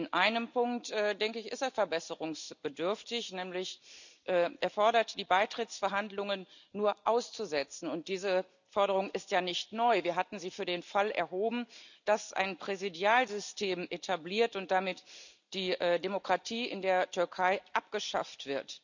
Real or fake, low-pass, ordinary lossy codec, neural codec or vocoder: real; 7.2 kHz; none; none